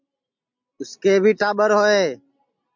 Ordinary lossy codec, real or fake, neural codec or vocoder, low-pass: MP3, 64 kbps; real; none; 7.2 kHz